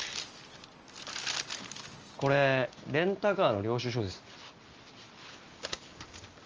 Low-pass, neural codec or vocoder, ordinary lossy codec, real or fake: 7.2 kHz; none; Opus, 24 kbps; real